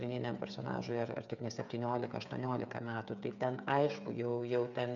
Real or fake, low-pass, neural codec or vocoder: fake; 7.2 kHz; codec, 16 kHz, 8 kbps, FreqCodec, smaller model